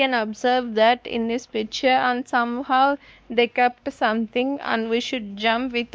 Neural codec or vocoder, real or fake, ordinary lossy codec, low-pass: codec, 16 kHz, 2 kbps, X-Codec, WavLM features, trained on Multilingual LibriSpeech; fake; none; none